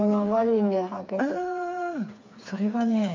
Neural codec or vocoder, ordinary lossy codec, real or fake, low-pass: codec, 16 kHz, 4 kbps, FreqCodec, smaller model; MP3, 48 kbps; fake; 7.2 kHz